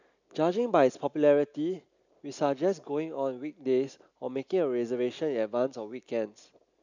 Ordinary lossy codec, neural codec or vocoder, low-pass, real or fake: none; none; 7.2 kHz; real